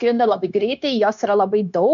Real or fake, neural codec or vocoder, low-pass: fake; codec, 16 kHz, 0.9 kbps, LongCat-Audio-Codec; 7.2 kHz